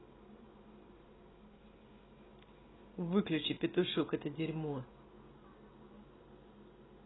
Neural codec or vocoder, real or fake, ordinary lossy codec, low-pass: none; real; AAC, 16 kbps; 7.2 kHz